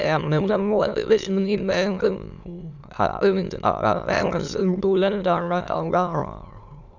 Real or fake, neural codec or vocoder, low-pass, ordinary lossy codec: fake; autoencoder, 22.05 kHz, a latent of 192 numbers a frame, VITS, trained on many speakers; 7.2 kHz; none